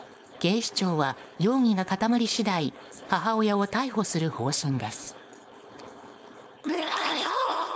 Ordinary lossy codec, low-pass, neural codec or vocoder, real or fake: none; none; codec, 16 kHz, 4.8 kbps, FACodec; fake